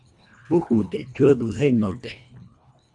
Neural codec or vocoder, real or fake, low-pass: codec, 24 kHz, 1.5 kbps, HILCodec; fake; 10.8 kHz